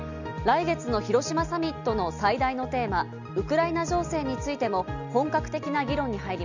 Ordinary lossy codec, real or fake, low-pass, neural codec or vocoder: none; real; 7.2 kHz; none